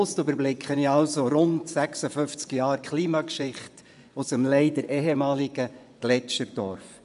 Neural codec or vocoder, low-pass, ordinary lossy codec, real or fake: vocoder, 24 kHz, 100 mel bands, Vocos; 10.8 kHz; none; fake